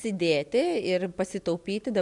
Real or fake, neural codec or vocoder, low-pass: real; none; 10.8 kHz